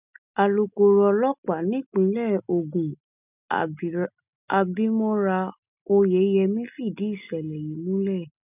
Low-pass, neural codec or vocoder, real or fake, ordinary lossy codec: 3.6 kHz; none; real; none